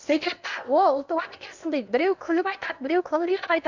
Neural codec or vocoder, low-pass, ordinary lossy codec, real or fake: codec, 16 kHz in and 24 kHz out, 0.8 kbps, FocalCodec, streaming, 65536 codes; 7.2 kHz; none; fake